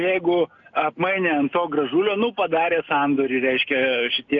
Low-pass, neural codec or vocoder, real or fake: 7.2 kHz; none; real